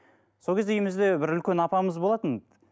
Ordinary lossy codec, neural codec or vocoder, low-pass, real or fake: none; none; none; real